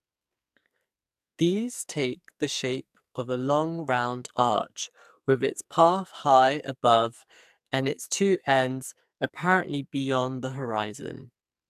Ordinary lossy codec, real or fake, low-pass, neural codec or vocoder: AAC, 96 kbps; fake; 14.4 kHz; codec, 44.1 kHz, 2.6 kbps, SNAC